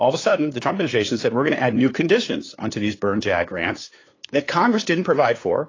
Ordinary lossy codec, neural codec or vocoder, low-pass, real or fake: AAC, 32 kbps; codec, 16 kHz, 2 kbps, FunCodec, trained on LibriTTS, 25 frames a second; 7.2 kHz; fake